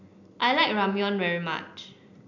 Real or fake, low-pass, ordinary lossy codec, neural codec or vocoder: real; 7.2 kHz; none; none